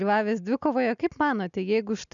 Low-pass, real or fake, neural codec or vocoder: 7.2 kHz; real; none